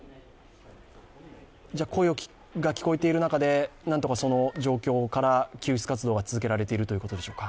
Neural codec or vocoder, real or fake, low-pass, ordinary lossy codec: none; real; none; none